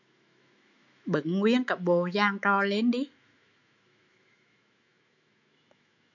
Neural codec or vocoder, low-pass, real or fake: autoencoder, 48 kHz, 128 numbers a frame, DAC-VAE, trained on Japanese speech; 7.2 kHz; fake